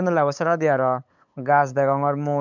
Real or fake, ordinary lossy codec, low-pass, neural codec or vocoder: fake; none; 7.2 kHz; codec, 16 kHz, 8 kbps, FunCodec, trained on LibriTTS, 25 frames a second